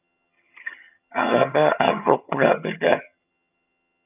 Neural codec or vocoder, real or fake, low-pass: vocoder, 22.05 kHz, 80 mel bands, HiFi-GAN; fake; 3.6 kHz